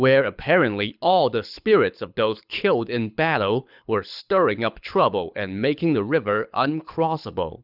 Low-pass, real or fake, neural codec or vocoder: 5.4 kHz; fake; codec, 24 kHz, 6 kbps, HILCodec